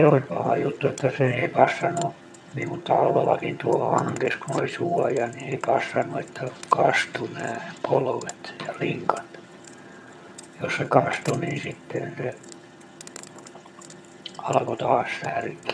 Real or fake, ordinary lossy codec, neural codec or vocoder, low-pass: fake; none; vocoder, 22.05 kHz, 80 mel bands, HiFi-GAN; none